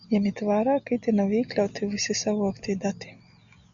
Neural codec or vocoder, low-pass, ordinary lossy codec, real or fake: none; 7.2 kHz; Opus, 64 kbps; real